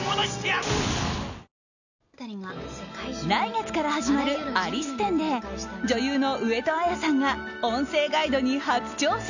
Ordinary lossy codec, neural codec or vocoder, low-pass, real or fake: none; none; 7.2 kHz; real